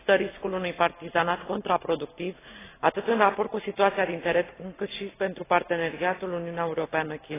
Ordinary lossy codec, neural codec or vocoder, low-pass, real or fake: AAC, 16 kbps; none; 3.6 kHz; real